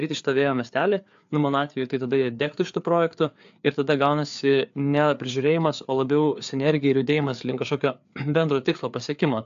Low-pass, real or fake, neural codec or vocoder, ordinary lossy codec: 7.2 kHz; fake; codec, 16 kHz, 4 kbps, FreqCodec, larger model; AAC, 64 kbps